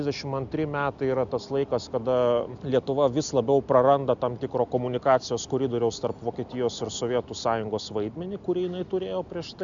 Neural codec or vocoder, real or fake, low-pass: none; real; 7.2 kHz